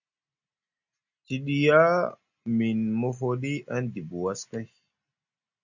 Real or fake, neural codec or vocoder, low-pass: real; none; 7.2 kHz